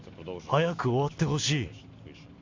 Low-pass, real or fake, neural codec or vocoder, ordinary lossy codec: 7.2 kHz; real; none; MP3, 64 kbps